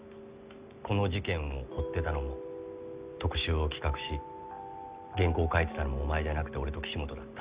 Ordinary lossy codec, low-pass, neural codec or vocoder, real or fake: Opus, 64 kbps; 3.6 kHz; none; real